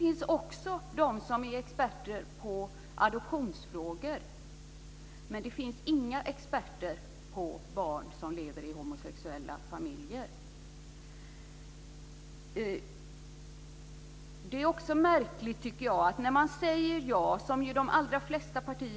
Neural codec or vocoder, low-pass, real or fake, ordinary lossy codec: none; none; real; none